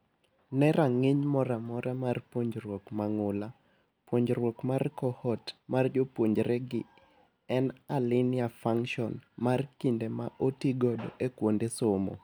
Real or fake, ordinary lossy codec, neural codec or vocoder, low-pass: real; none; none; none